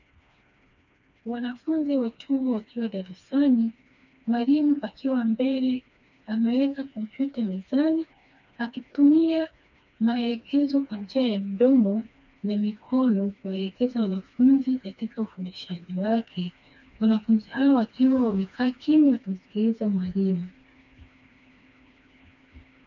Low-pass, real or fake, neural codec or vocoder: 7.2 kHz; fake; codec, 16 kHz, 2 kbps, FreqCodec, smaller model